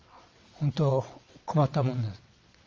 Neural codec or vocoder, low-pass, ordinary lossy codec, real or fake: vocoder, 22.05 kHz, 80 mel bands, WaveNeXt; 7.2 kHz; Opus, 32 kbps; fake